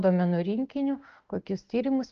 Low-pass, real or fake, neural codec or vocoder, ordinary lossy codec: 7.2 kHz; real; none; Opus, 16 kbps